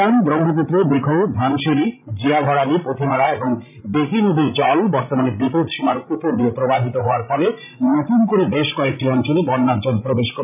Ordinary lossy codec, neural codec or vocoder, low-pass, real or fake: none; codec, 16 kHz, 16 kbps, FreqCodec, larger model; 3.6 kHz; fake